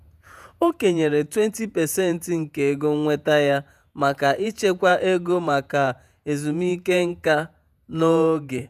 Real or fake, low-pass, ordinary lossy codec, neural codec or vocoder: fake; 14.4 kHz; none; vocoder, 48 kHz, 128 mel bands, Vocos